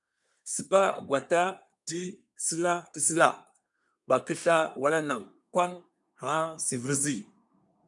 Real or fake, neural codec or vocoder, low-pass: fake; codec, 24 kHz, 1 kbps, SNAC; 10.8 kHz